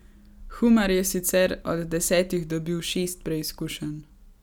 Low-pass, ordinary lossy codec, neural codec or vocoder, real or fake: none; none; none; real